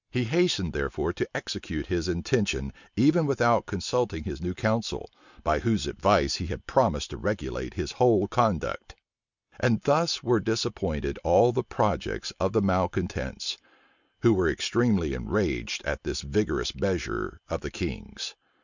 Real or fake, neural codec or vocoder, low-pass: real; none; 7.2 kHz